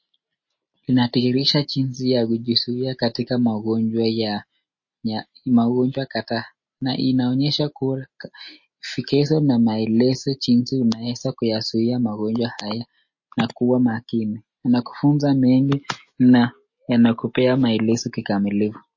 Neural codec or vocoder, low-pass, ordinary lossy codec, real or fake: none; 7.2 kHz; MP3, 32 kbps; real